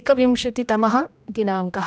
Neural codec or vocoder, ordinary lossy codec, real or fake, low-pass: codec, 16 kHz, 1 kbps, X-Codec, HuBERT features, trained on general audio; none; fake; none